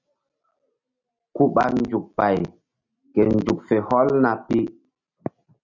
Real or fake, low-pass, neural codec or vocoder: real; 7.2 kHz; none